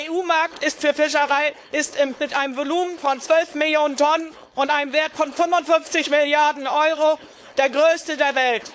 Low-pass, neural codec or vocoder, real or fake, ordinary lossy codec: none; codec, 16 kHz, 4.8 kbps, FACodec; fake; none